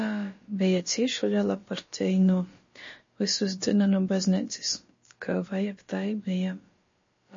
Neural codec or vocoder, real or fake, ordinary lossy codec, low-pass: codec, 16 kHz, about 1 kbps, DyCAST, with the encoder's durations; fake; MP3, 32 kbps; 7.2 kHz